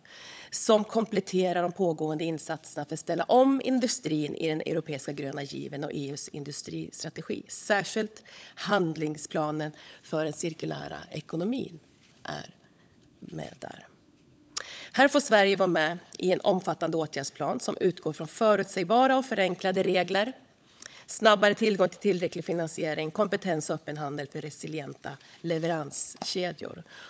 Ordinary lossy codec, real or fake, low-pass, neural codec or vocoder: none; fake; none; codec, 16 kHz, 16 kbps, FunCodec, trained on LibriTTS, 50 frames a second